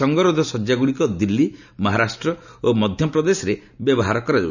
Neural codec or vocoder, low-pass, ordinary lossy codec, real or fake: none; 7.2 kHz; none; real